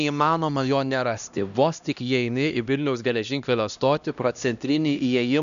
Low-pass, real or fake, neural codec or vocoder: 7.2 kHz; fake; codec, 16 kHz, 1 kbps, X-Codec, HuBERT features, trained on LibriSpeech